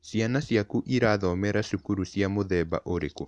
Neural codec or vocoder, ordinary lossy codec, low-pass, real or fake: none; none; none; real